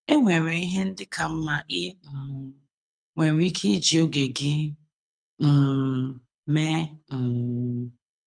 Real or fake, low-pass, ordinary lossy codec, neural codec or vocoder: fake; 9.9 kHz; none; codec, 24 kHz, 6 kbps, HILCodec